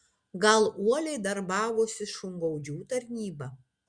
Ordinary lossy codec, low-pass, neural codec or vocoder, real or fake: Opus, 64 kbps; 9.9 kHz; none; real